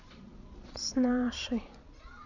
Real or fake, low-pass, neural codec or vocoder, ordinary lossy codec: fake; 7.2 kHz; vocoder, 22.05 kHz, 80 mel bands, Vocos; none